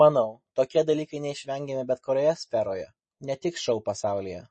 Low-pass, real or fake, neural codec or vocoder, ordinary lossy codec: 9.9 kHz; real; none; MP3, 32 kbps